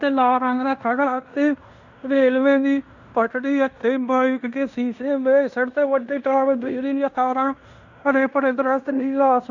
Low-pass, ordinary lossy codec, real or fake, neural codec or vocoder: 7.2 kHz; none; fake; codec, 16 kHz in and 24 kHz out, 0.9 kbps, LongCat-Audio-Codec, fine tuned four codebook decoder